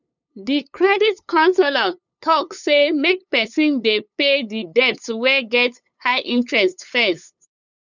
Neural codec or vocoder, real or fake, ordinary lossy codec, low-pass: codec, 16 kHz, 8 kbps, FunCodec, trained on LibriTTS, 25 frames a second; fake; none; 7.2 kHz